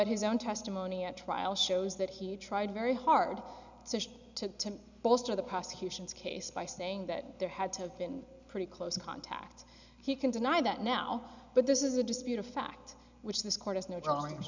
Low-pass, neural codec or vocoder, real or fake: 7.2 kHz; none; real